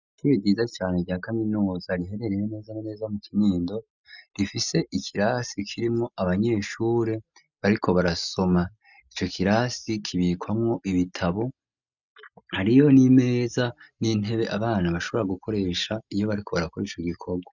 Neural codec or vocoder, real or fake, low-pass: none; real; 7.2 kHz